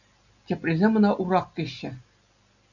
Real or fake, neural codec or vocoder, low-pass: real; none; 7.2 kHz